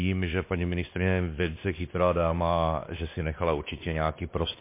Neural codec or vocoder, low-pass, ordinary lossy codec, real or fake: codec, 16 kHz, 2 kbps, X-Codec, WavLM features, trained on Multilingual LibriSpeech; 3.6 kHz; MP3, 24 kbps; fake